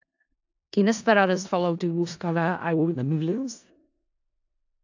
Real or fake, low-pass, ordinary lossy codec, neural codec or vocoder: fake; 7.2 kHz; AAC, 48 kbps; codec, 16 kHz in and 24 kHz out, 0.4 kbps, LongCat-Audio-Codec, four codebook decoder